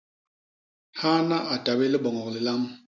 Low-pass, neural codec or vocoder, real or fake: 7.2 kHz; none; real